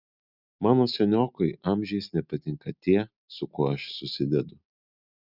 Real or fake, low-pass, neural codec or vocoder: real; 5.4 kHz; none